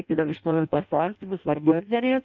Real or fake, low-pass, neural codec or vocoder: fake; 7.2 kHz; codec, 16 kHz in and 24 kHz out, 0.6 kbps, FireRedTTS-2 codec